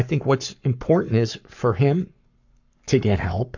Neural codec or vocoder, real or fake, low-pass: codec, 44.1 kHz, 7.8 kbps, Pupu-Codec; fake; 7.2 kHz